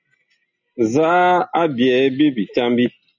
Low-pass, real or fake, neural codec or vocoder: 7.2 kHz; real; none